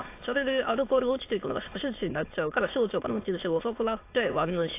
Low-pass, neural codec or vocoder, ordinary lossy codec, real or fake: 3.6 kHz; autoencoder, 22.05 kHz, a latent of 192 numbers a frame, VITS, trained on many speakers; AAC, 24 kbps; fake